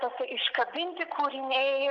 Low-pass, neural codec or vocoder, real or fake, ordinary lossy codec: 7.2 kHz; none; real; MP3, 64 kbps